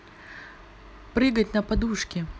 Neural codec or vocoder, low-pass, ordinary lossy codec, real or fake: none; none; none; real